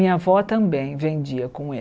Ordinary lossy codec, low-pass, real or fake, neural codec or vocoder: none; none; real; none